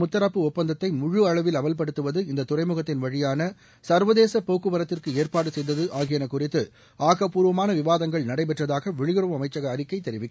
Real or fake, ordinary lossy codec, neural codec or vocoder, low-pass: real; none; none; none